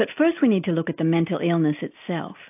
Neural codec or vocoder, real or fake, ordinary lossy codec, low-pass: none; real; AAC, 32 kbps; 3.6 kHz